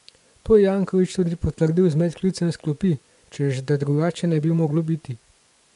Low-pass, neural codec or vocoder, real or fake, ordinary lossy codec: 10.8 kHz; vocoder, 24 kHz, 100 mel bands, Vocos; fake; none